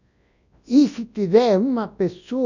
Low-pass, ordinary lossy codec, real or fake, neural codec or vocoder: 7.2 kHz; none; fake; codec, 24 kHz, 0.9 kbps, WavTokenizer, large speech release